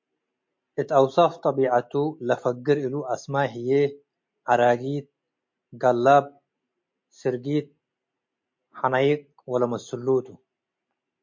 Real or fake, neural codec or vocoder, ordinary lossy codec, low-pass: real; none; MP3, 48 kbps; 7.2 kHz